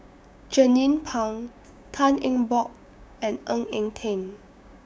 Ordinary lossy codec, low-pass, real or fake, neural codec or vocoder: none; none; fake; codec, 16 kHz, 6 kbps, DAC